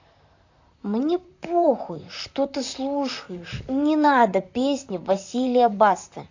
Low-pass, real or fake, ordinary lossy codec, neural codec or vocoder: 7.2 kHz; fake; AAC, 48 kbps; vocoder, 44.1 kHz, 128 mel bands, Pupu-Vocoder